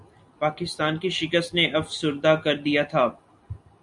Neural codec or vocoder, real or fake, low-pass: none; real; 10.8 kHz